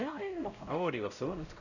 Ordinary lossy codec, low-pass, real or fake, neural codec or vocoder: none; 7.2 kHz; fake; codec, 16 kHz, 0.5 kbps, X-Codec, WavLM features, trained on Multilingual LibriSpeech